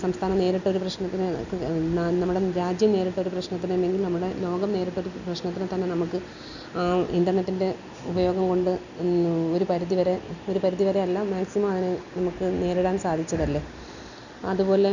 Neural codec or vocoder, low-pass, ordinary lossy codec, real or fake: none; 7.2 kHz; none; real